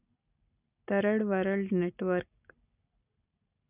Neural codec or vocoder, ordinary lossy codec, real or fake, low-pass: none; none; real; 3.6 kHz